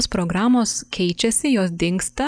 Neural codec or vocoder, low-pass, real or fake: none; 9.9 kHz; real